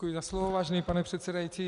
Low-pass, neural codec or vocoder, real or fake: 10.8 kHz; none; real